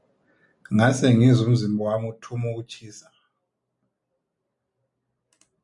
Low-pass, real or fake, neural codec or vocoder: 10.8 kHz; real; none